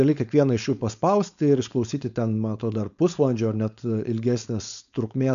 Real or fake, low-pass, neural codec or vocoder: fake; 7.2 kHz; codec, 16 kHz, 4.8 kbps, FACodec